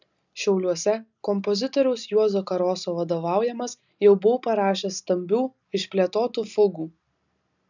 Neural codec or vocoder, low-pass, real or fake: none; 7.2 kHz; real